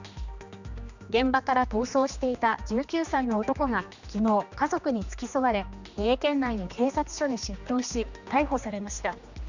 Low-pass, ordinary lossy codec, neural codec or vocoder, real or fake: 7.2 kHz; none; codec, 16 kHz, 2 kbps, X-Codec, HuBERT features, trained on general audio; fake